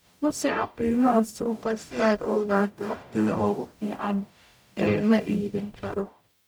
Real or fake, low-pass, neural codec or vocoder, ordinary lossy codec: fake; none; codec, 44.1 kHz, 0.9 kbps, DAC; none